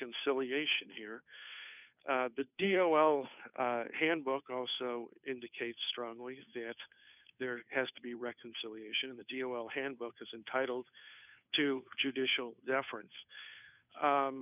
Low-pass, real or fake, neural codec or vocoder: 3.6 kHz; fake; codec, 16 kHz, 2 kbps, FunCodec, trained on Chinese and English, 25 frames a second